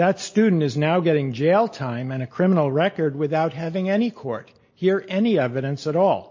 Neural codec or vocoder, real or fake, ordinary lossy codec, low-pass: none; real; MP3, 32 kbps; 7.2 kHz